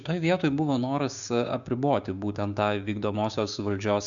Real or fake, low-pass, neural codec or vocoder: fake; 7.2 kHz; codec, 16 kHz, 6 kbps, DAC